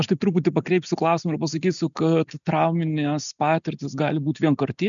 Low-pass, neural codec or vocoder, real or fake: 7.2 kHz; none; real